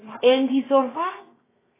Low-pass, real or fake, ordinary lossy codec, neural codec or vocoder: 3.6 kHz; fake; AAC, 16 kbps; codec, 16 kHz, 0.7 kbps, FocalCodec